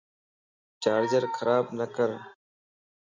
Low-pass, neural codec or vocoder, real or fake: 7.2 kHz; none; real